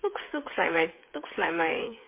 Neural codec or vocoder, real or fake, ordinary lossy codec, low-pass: codec, 16 kHz, 8 kbps, FreqCodec, smaller model; fake; MP3, 24 kbps; 3.6 kHz